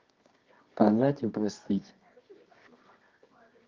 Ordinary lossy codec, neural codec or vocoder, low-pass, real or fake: Opus, 24 kbps; codec, 24 kHz, 0.9 kbps, WavTokenizer, medium music audio release; 7.2 kHz; fake